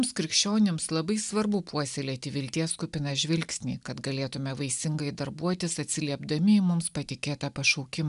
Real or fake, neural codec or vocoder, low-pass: real; none; 10.8 kHz